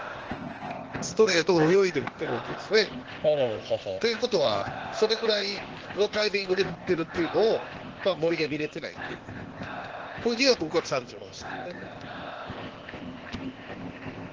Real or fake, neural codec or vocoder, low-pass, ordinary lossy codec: fake; codec, 16 kHz, 0.8 kbps, ZipCodec; 7.2 kHz; Opus, 16 kbps